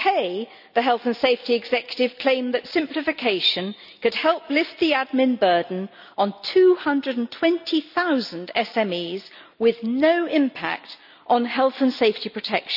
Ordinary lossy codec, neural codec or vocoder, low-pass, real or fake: none; none; 5.4 kHz; real